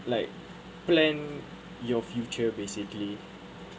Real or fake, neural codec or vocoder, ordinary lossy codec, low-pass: real; none; none; none